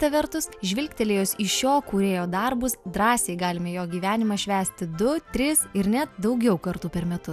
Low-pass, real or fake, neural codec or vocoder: 14.4 kHz; real; none